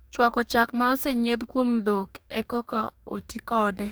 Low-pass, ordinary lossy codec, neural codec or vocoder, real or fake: none; none; codec, 44.1 kHz, 2.6 kbps, DAC; fake